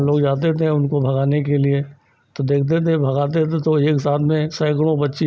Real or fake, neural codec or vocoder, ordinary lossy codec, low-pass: real; none; none; none